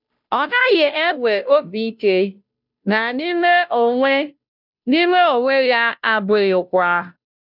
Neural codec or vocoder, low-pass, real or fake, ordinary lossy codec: codec, 16 kHz, 0.5 kbps, FunCodec, trained on Chinese and English, 25 frames a second; 5.4 kHz; fake; none